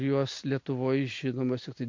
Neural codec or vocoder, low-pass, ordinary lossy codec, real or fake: none; 7.2 kHz; MP3, 48 kbps; real